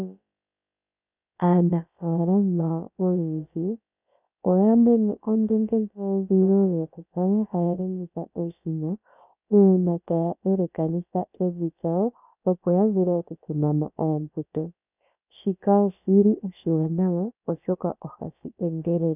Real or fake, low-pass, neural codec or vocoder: fake; 3.6 kHz; codec, 16 kHz, about 1 kbps, DyCAST, with the encoder's durations